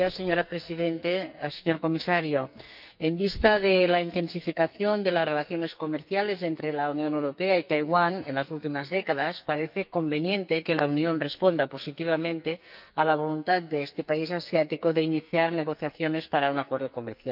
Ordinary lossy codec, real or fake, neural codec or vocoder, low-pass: none; fake; codec, 44.1 kHz, 2.6 kbps, SNAC; 5.4 kHz